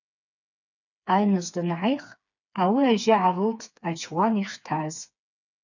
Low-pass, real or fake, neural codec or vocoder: 7.2 kHz; fake; codec, 16 kHz, 4 kbps, FreqCodec, smaller model